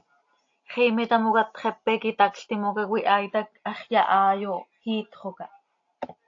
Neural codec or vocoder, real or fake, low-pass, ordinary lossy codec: none; real; 7.2 kHz; AAC, 48 kbps